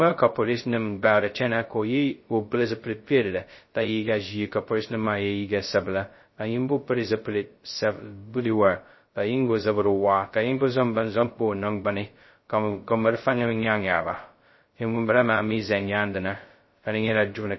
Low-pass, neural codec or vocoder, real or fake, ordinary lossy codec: 7.2 kHz; codec, 16 kHz, 0.2 kbps, FocalCodec; fake; MP3, 24 kbps